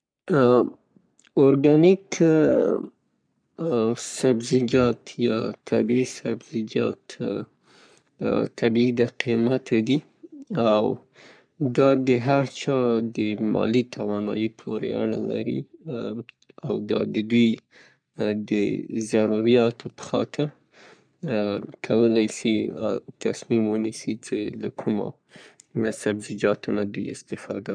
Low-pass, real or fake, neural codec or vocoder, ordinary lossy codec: 9.9 kHz; fake; codec, 44.1 kHz, 3.4 kbps, Pupu-Codec; none